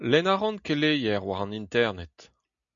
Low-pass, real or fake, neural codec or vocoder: 7.2 kHz; real; none